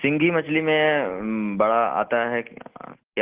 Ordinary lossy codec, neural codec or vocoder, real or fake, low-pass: Opus, 16 kbps; none; real; 3.6 kHz